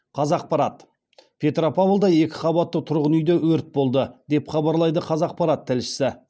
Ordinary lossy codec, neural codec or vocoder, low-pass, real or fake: none; none; none; real